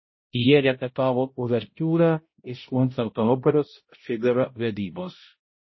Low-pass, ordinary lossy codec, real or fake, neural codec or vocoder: 7.2 kHz; MP3, 24 kbps; fake; codec, 16 kHz, 0.5 kbps, X-Codec, HuBERT features, trained on balanced general audio